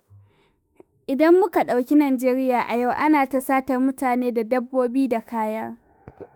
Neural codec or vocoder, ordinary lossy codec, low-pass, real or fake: autoencoder, 48 kHz, 32 numbers a frame, DAC-VAE, trained on Japanese speech; none; none; fake